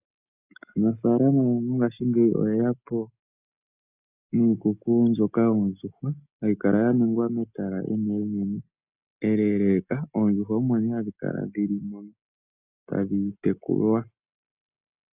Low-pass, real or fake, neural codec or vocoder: 3.6 kHz; real; none